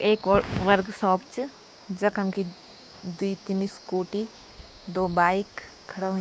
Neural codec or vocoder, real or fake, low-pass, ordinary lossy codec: codec, 16 kHz, 6 kbps, DAC; fake; none; none